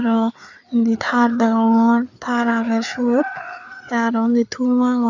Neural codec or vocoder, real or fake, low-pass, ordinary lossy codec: codec, 16 kHz, 4 kbps, FreqCodec, larger model; fake; 7.2 kHz; none